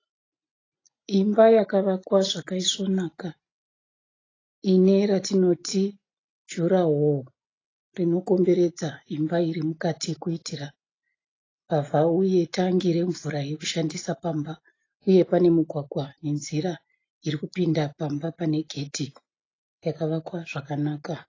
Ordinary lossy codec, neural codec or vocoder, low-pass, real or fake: AAC, 32 kbps; none; 7.2 kHz; real